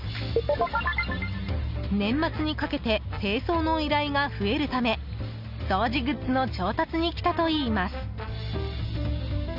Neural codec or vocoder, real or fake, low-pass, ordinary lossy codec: none; real; 5.4 kHz; none